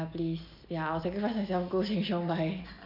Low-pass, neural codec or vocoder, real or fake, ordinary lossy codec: 5.4 kHz; none; real; none